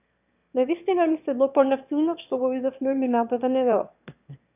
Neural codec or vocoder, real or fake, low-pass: autoencoder, 22.05 kHz, a latent of 192 numbers a frame, VITS, trained on one speaker; fake; 3.6 kHz